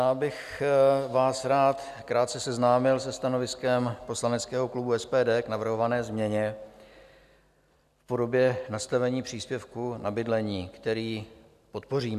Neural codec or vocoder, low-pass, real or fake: none; 14.4 kHz; real